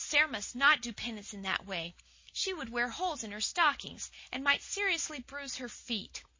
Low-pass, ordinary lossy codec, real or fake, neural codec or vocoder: 7.2 kHz; MP3, 32 kbps; real; none